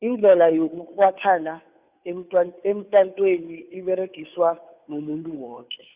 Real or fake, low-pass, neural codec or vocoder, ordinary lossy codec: fake; 3.6 kHz; codec, 16 kHz, 2 kbps, FunCodec, trained on Chinese and English, 25 frames a second; Opus, 64 kbps